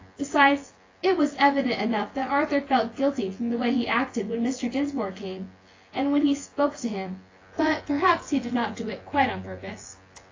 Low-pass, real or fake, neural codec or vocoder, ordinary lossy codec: 7.2 kHz; fake; vocoder, 24 kHz, 100 mel bands, Vocos; AAC, 32 kbps